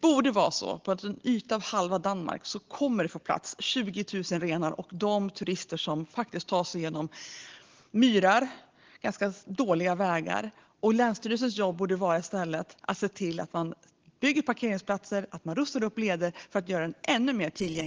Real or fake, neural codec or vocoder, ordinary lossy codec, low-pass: real; none; Opus, 16 kbps; 7.2 kHz